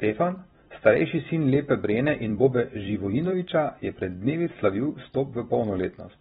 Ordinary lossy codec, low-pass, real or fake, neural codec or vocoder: AAC, 16 kbps; 19.8 kHz; fake; vocoder, 44.1 kHz, 128 mel bands every 256 samples, BigVGAN v2